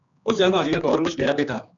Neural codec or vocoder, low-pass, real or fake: codec, 16 kHz, 2 kbps, X-Codec, HuBERT features, trained on general audio; 7.2 kHz; fake